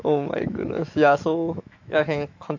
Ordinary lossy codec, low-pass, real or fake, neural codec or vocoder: AAC, 48 kbps; 7.2 kHz; fake; autoencoder, 48 kHz, 128 numbers a frame, DAC-VAE, trained on Japanese speech